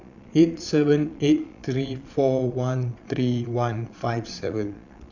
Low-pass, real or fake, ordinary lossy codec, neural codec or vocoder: 7.2 kHz; fake; none; vocoder, 22.05 kHz, 80 mel bands, Vocos